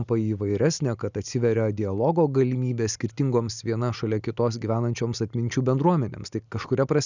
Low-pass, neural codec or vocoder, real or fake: 7.2 kHz; none; real